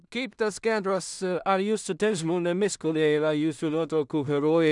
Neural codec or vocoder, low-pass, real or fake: codec, 16 kHz in and 24 kHz out, 0.4 kbps, LongCat-Audio-Codec, two codebook decoder; 10.8 kHz; fake